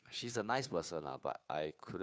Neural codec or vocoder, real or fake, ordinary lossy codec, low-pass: codec, 16 kHz, 2 kbps, FunCodec, trained on Chinese and English, 25 frames a second; fake; none; none